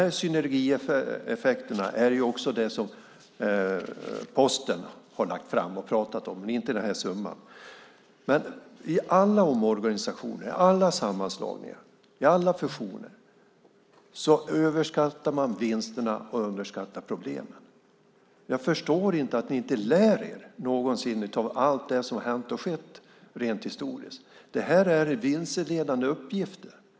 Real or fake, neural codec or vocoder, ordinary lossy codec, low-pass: real; none; none; none